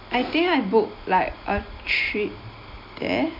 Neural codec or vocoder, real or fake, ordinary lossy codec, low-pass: none; real; MP3, 48 kbps; 5.4 kHz